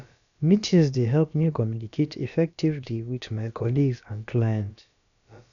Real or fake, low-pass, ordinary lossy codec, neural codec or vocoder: fake; 7.2 kHz; none; codec, 16 kHz, about 1 kbps, DyCAST, with the encoder's durations